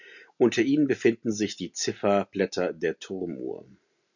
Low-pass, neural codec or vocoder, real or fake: 7.2 kHz; none; real